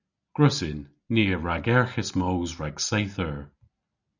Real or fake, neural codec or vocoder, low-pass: real; none; 7.2 kHz